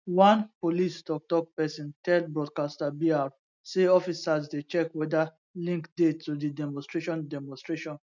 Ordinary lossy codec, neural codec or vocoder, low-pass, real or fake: none; none; 7.2 kHz; real